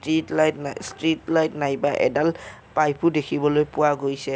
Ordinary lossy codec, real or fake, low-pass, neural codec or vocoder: none; real; none; none